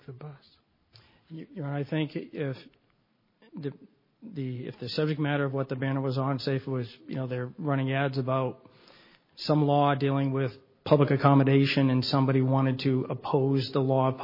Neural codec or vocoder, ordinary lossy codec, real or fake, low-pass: none; MP3, 24 kbps; real; 5.4 kHz